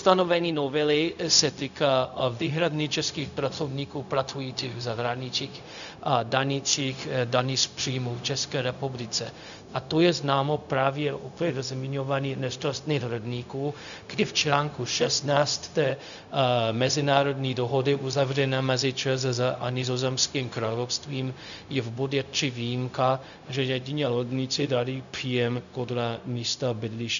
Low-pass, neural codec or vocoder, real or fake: 7.2 kHz; codec, 16 kHz, 0.4 kbps, LongCat-Audio-Codec; fake